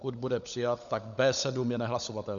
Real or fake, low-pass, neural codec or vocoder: fake; 7.2 kHz; codec, 16 kHz, 16 kbps, FunCodec, trained on LibriTTS, 50 frames a second